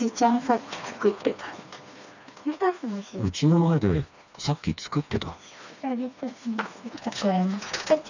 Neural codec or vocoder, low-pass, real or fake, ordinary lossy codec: codec, 16 kHz, 2 kbps, FreqCodec, smaller model; 7.2 kHz; fake; none